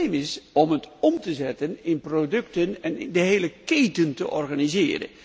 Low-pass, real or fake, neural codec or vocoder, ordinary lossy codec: none; real; none; none